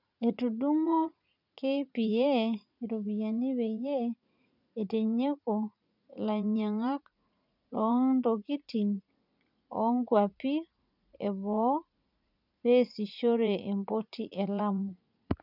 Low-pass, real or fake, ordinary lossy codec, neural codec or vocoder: 5.4 kHz; fake; none; vocoder, 44.1 kHz, 80 mel bands, Vocos